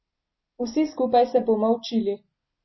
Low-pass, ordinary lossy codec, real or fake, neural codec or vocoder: 7.2 kHz; MP3, 24 kbps; real; none